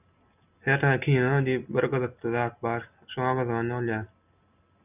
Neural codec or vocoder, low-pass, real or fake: none; 3.6 kHz; real